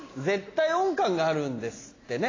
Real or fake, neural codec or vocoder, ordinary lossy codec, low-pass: real; none; AAC, 32 kbps; 7.2 kHz